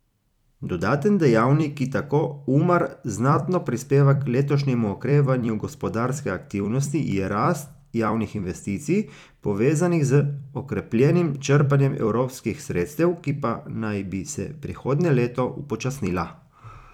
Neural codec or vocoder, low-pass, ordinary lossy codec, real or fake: vocoder, 44.1 kHz, 128 mel bands every 256 samples, BigVGAN v2; 19.8 kHz; none; fake